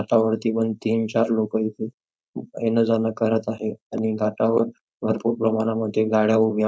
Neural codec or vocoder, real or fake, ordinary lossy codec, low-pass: codec, 16 kHz, 4.8 kbps, FACodec; fake; none; none